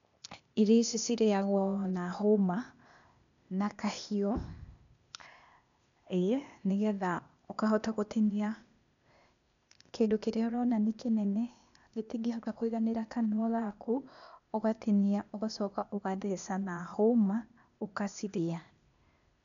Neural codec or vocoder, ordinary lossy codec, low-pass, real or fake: codec, 16 kHz, 0.8 kbps, ZipCodec; none; 7.2 kHz; fake